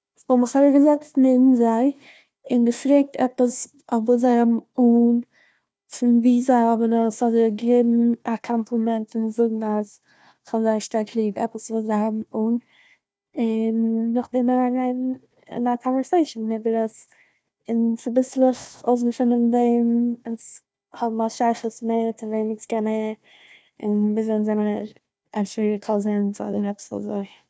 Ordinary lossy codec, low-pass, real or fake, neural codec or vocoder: none; none; fake; codec, 16 kHz, 1 kbps, FunCodec, trained on Chinese and English, 50 frames a second